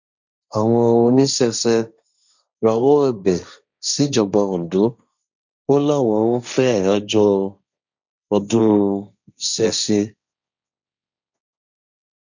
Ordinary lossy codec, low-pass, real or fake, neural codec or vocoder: none; 7.2 kHz; fake; codec, 16 kHz, 1.1 kbps, Voila-Tokenizer